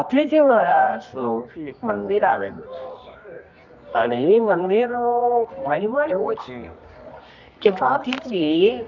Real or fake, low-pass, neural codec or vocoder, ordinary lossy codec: fake; 7.2 kHz; codec, 24 kHz, 0.9 kbps, WavTokenizer, medium music audio release; none